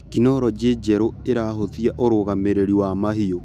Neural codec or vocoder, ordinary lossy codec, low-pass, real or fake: autoencoder, 48 kHz, 128 numbers a frame, DAC-VAE, trained on Japanese speech; none; 14.4 kHz; fake